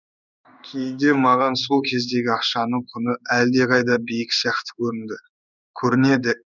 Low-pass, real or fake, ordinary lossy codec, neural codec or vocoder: 7.2 kHz; fake; none; codec, 16 kHz in and 24 kHz out, 1 kbps, XY-Tokenizer